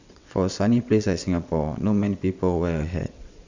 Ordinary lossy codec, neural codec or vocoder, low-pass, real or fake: Opus, 64 kbps; vocoder, 22.05 kHz, 80 mel bands, WaveNeXt; 7.2 kHz; fake